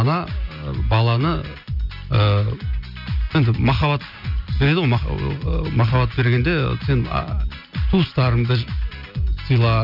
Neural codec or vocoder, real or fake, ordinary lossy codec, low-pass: none; real; none; 5.4 kHz